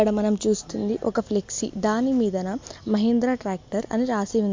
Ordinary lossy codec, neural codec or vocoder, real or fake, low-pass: MP3, 48 kbps; none; real; 7.2 kHz